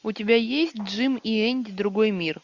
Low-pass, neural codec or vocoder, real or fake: 7.2 kHz; none; real